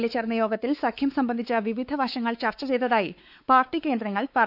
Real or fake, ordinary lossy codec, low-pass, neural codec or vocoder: fake; none; 5.4 kHz; codec, 16 kHz, 4 kbps, X-Codec, WavLM features, trained on Multilingual LibriSpeech